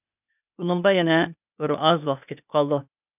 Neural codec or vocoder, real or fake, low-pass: codec, 16 kHz, 0.8 kbps, ZipCodec; fake; 3.6 kHz